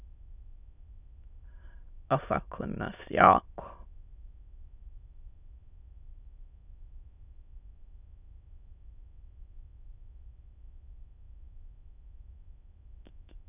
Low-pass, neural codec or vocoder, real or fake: 3.6 kHz; autoencoder, 22.05 kHz, a latent of 192 numbers a frame, VITS, trained on many speakers; fake